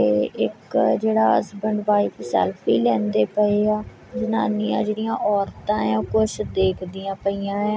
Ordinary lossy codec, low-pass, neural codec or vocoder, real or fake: none; none; none; real